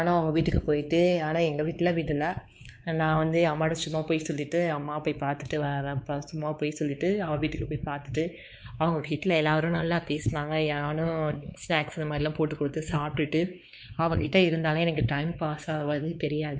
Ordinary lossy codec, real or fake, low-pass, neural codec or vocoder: none; fake; none; codec, 16 kHz, 4 kbps, X-Codec, WavLM features, trained on Multilingual LibriSpeech